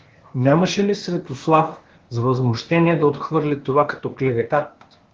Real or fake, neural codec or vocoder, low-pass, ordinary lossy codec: fake; codec, 16 kHz, 0.8 kbps, ZipCodec; 7.2 kHz; Opus, 16 kbps